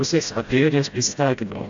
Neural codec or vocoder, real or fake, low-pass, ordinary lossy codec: codec, 16 kHz, 0.5 kbps, FreqCodec, smaller model; fake; 7.2 kHz; AAC, 64 kbps